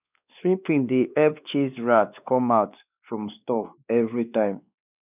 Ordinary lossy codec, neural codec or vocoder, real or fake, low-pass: none; codec, 16 kHz, 2 kbps, X-Codec, WavLM features, trained on Multilingual LibriSpeech; fake; 3.6 kHz